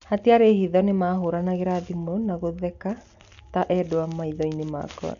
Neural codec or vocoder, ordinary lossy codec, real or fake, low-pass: none; none; real; 7.2 kHz